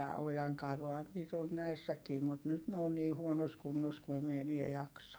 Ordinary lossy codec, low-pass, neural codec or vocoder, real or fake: none; none; codec, 44.1 kHz, 2.6 kbps, SNAC; fake